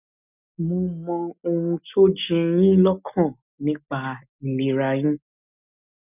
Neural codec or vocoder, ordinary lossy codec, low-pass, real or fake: none; none; 3.6 kHz; real